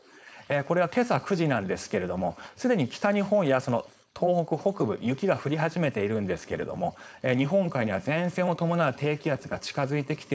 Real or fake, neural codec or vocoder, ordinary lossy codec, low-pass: fake; codec, 16 kHz, 4.8 kbps, FACodec; none; none